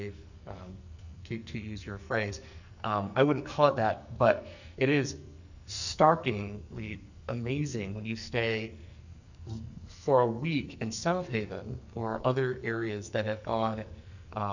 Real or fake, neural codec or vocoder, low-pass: fake; codec, 44.1 kHz, 2.6 kbps, SNAC; 7.2 kHz